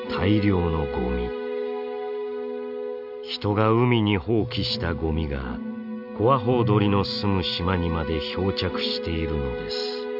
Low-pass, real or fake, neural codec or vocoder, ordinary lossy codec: 5.4 kHz; real; none; none